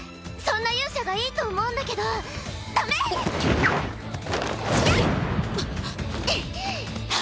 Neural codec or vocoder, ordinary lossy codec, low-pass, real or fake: none; none; none; real